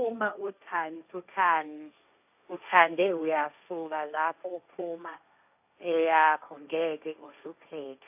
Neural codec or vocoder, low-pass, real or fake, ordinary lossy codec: codec, 16 kHz, 1.1 kbps, Voila-Tokenizer; 3.6 kHz; fake; AAC, 32 kbps